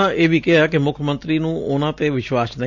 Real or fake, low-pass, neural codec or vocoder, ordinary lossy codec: real; 7.2 kHz; none; none